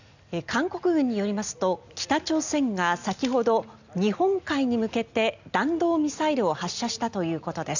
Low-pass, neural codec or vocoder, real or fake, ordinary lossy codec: 7.2 kHz; none; real; none